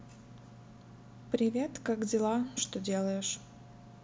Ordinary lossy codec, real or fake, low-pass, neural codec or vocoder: none; real; none; none